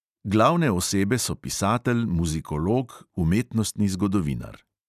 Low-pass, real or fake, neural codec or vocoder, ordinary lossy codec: 14.4 kHz; real; none; none